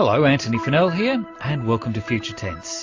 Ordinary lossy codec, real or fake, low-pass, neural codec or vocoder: AAC, 48 kbps; real; 7.2 kHz; none